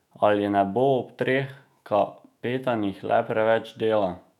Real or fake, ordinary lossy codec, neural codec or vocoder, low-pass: fake; none; autoencoder, 48 kHz, 128 numbers a frame, DAC-VAE, trained on Japanese speech; 19.8 kHz